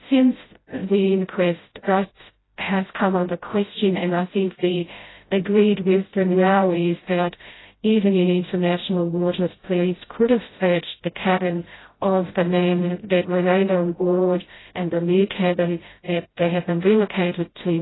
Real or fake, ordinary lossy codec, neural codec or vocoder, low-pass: fake; AAC, 16 kbps; codec, 16 kHz, 0.5 kbps, FreqCodec, smaller model; 7.2 kHz